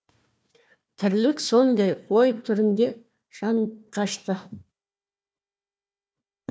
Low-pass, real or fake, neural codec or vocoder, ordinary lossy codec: none; fake; codec, 16 kHz, 1 kbps, FunCodec, trained on Chinese and English, 50 frames a second; none